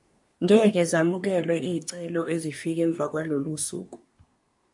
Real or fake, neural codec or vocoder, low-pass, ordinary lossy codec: fake; codec, 24 kHz, 1 kbps, SNAC; 10.8 kHz; MP3, 48 kbps